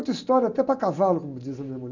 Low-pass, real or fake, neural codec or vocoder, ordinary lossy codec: 7.2 kHz; real; none; none